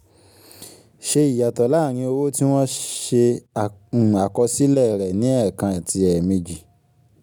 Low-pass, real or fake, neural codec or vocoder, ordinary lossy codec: none; real; none; none